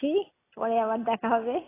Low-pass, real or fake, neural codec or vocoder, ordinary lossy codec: 3.6 kHz; real; none; AAC, 16 kbps